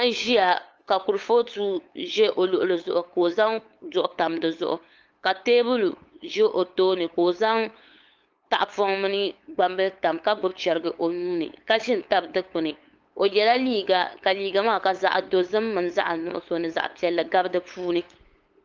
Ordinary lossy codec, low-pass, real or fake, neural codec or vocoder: Opus, 24 kbps; 7.2 kHz; fake; codec, 16 kHz, 8 kbps, FunCodec, trained on LibriTTS, 25 frames a second